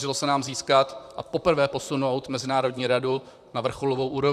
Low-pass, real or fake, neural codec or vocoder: 14.4 kHz; fake; vocoder, 44.1 kHz, 128 mel bands, Pupu-Vocoder